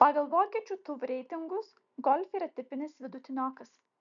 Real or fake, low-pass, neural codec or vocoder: real; 7.2 kHz; none